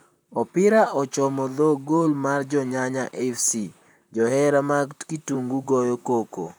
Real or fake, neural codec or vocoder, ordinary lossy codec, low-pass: fake; vocoder, 44.1 kHz, 128 mel bands, Pupu-Vocoder; none; none